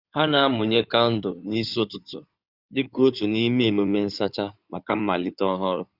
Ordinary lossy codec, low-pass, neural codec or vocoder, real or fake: Opus, 64 kbps; 5.4 kHz; codec, 16 kHz in and 24 kHz out, 2.2 kbps, FireRedTTS-2 codec; fake